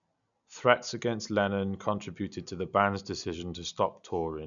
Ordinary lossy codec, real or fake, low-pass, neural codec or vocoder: none; real; 7.2 kHz; none